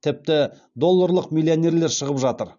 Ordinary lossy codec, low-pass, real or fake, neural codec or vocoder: none; 7.2 kHz; real; none